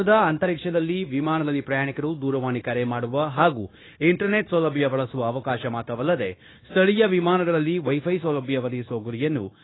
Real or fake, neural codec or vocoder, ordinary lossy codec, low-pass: fake; codec, 16 kHz, 0.9 kbps, LongCat-Audio-Codec; AAC, 16 kbps; 7.2 kHz